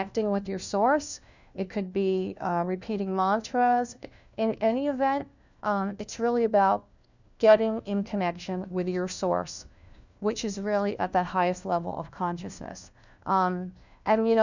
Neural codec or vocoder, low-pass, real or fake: codec, 16 kHz, 1 kbps, FunCodec, trained on LibriTTS, 50 frames a second; 7.2 kHz; fake